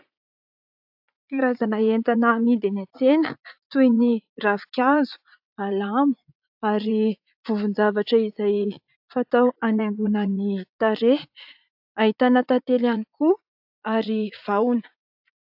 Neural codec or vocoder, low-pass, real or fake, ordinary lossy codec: vocoder, 44.1 kHz, 80 mel bands, Vocos; 5.4 kHz; fake; AAC, 48 kbps